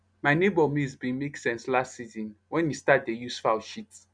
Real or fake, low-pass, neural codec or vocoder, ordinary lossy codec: real; 9.9 kHz; none; none